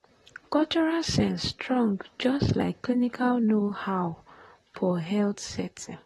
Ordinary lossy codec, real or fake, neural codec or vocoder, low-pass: AAC, 32 kbps; fake; vocoder, 44.1 kHz, 128 mel bands, Pupu-Vocoder; 19.8 kHz